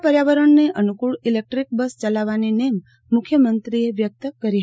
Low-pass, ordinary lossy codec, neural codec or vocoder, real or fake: none; none; none; real